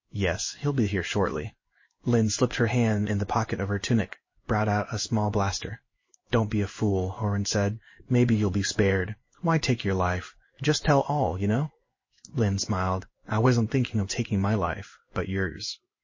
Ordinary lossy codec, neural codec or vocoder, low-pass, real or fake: MP3, 32 kbps; codec, 16 kHz in and 24 kHz out, 1 kbps, XY-Tokenizer; 7.2 kHz; fake